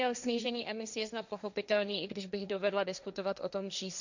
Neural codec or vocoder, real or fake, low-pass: codec, 16 kHz, 1.1 kbps, Voila-Tokenizer; fake; 7.2 kHz